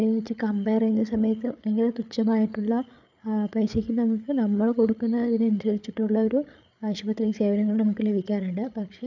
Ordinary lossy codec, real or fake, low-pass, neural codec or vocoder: none; fake; 7.2 kHz; codec, 16 kHz, 8 kbps, FreqCodec, larger model